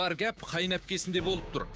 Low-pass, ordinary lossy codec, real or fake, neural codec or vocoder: none; none; fake; codec, 16 kHz, 6 kbps, DAC